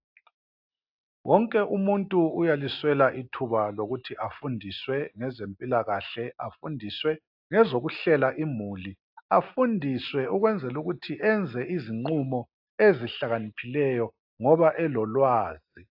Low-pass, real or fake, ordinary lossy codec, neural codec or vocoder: 5.4 kHz; real; AAC, 48 kbps; none